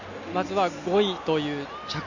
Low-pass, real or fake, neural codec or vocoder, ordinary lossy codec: 7.2 kHz; real; none; none